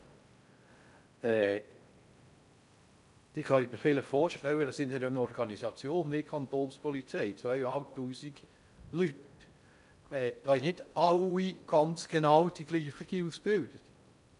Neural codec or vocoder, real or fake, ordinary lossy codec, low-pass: codec, 16 kHz in and 24 kHz out, 0.6 kbps, FocalCodec, streaming, 4096 codes; fake; none; 10.8 kHz